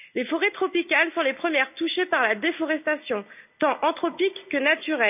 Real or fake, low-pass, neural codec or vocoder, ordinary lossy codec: real; 3.6 kHz; none; none